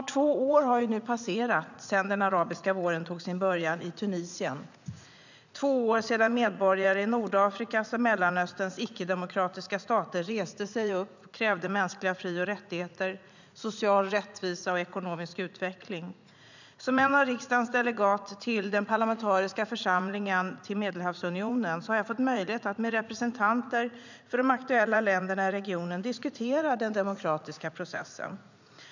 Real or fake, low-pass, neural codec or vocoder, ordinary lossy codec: fake; 7.2 kHz; vocoder, 44.1 kHz, 80 mel bands, Vocos; none